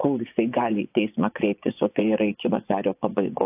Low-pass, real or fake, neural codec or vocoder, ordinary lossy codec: 3.6 kHz; fake; vocoder, 24 kHz, 100 mel bands, Vocos; AAC, 32 kbps